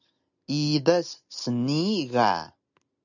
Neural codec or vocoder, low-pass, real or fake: none; 7.2 kHz; real